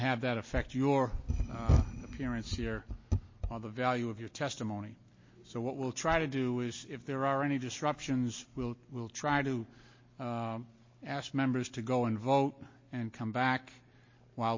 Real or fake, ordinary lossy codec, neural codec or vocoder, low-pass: real; MP3, 32 kbps; none; 7.2 kHz